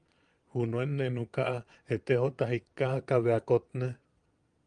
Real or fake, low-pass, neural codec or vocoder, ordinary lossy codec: fake; 9.9 kHz; vocoder, 22.05 kHz, 80 mel bands, Vocos; Opus, 32 kbps